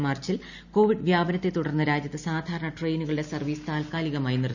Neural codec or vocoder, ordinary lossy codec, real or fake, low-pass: none; none; real; 7.2 kHz